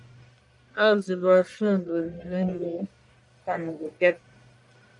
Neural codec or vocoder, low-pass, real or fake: codec, 44.1 kHz, 1.7 kbps, Pupu-Codec; 10.8 kHz; fake